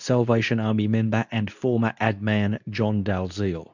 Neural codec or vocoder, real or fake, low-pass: codec, 24 kHz, 0.9 kbps, WavTokenizer, medium speech release version 2; fake; 7.2 kHz